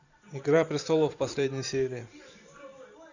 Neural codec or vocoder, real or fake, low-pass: vocoder, 44.1 kHz, 128 mel bands every 512 samples, BigVGAN v2; fake; 7.2 kHz